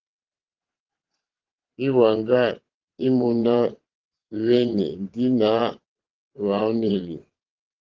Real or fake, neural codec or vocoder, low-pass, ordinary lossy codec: fake; vocoder, 22.05 kHz, 80 mel bands, WaveNeXt; 7.2 kHz; Opus, 16 kbps